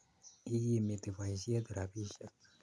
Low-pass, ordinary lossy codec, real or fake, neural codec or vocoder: none; none; real; none